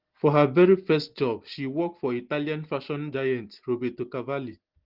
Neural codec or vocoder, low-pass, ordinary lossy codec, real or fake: none; 5.4 kHz; Opus, 16 kbps; real